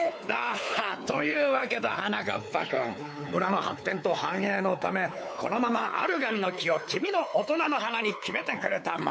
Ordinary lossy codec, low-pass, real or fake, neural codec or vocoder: none; none; fake; codec, 16 kHz, 4 kbps, X-Codec, WavLM features, trained on Multilingual LibriSpeech